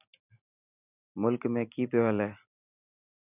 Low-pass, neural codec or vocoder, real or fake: 3.6 kHz; none; real